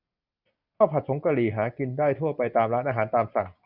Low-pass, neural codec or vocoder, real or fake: 5.4 kHz; none; real